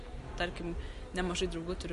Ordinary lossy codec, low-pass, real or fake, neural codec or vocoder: MP3, 48 kbps; 14.4 kHz; real; none